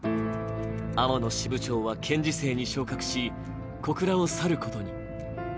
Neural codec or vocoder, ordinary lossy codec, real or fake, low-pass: none; none; real; none